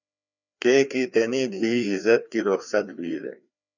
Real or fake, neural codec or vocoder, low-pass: fake; codec, 16 kHz, 2 kbps, FreqCodec, larger model; 7.2 kHz